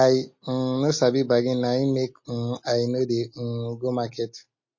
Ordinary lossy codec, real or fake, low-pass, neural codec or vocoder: MP3, 32 kbps; real; 7.2 kHz; none